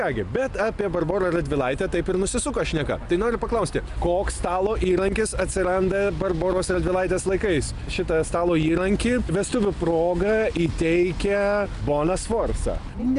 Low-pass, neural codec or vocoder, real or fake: 10.8 kHz; none; real